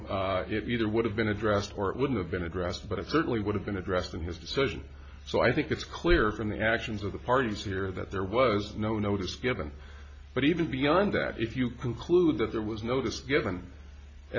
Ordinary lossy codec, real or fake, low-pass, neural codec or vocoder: MP3, 32 kbps; real; 7.2 kHz; none